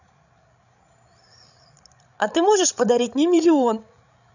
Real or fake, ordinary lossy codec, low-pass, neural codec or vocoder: fake; none; 7.2 kHz; codec, 16 kHz, 16 kbps, FreqCodec, larger model